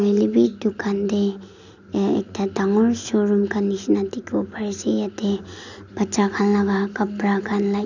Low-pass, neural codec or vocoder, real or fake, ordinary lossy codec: 7.2 kHz; none; real; none